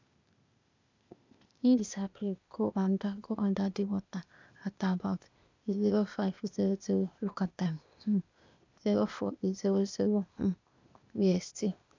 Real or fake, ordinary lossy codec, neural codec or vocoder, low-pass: fake; MP3, 64 kbps; codec, 16 kHz, 0.8 kbps, ZipCodec; 7.2 kHz